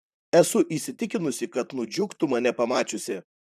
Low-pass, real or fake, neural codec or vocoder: 14.4 kHz; fake; vocoder, 44.1 kHz, 128 mel bands, Pupu-Vocoder